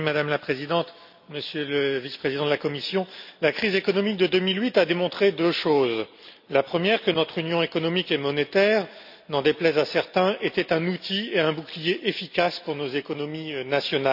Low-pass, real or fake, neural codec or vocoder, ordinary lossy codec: 5.4 kHz; real; none; none